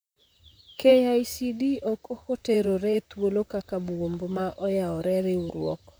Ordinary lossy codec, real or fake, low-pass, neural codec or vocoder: none; fake; none; vocoder, 44.1 kHz, 128 mel bands, Pupu-Vocoder